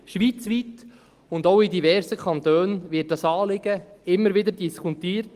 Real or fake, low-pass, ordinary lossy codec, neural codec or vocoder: real; 14.4 kHz; Opus, 24 kbps; none